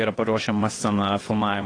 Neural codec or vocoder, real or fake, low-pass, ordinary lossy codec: vocoder, 22.05 kHz, 80 mel bands, WaveNeXt; fake; 9.9 kHz; AAC, 32 kbps